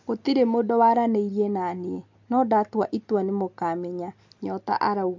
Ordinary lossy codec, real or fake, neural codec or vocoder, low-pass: MP3, 64 kbps; real; none; 7.2 kHz